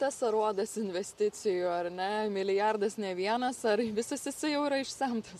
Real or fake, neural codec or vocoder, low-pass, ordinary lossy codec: real; none; 14.4 kHz; MP3, 96 kbps